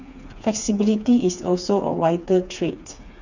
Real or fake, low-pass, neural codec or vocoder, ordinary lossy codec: fake; 7.2 kHz; codec, 16 kHz, 4 kbps, FreqCodec, smaller model; none